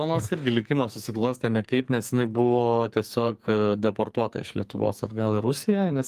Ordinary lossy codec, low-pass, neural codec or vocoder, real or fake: Opus, 32 kbps; 14.4 kHz; codec, 44.1 kHz, 2.6 kbps, SNAC; fake